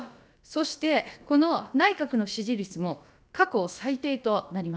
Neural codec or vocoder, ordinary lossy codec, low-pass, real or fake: codec, 16 kHz, about 1 kbps, DyCAST, with the encoder's durations; none; none; fake